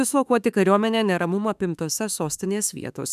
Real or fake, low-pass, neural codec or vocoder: fake; 14.4 kHz; autoencoder, 48 kHz, 32 numbers a frame, DAC-VAE, trained on Japanese speech